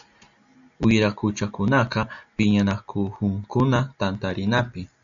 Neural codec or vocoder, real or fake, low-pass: none; real; 7.2 kHz